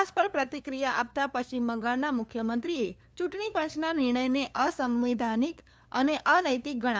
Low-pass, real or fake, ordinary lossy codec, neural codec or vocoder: none; fake; none; codec, 16 kHz, 2 kbps, FunCodec, trained on LibriTTS, 25 frames a second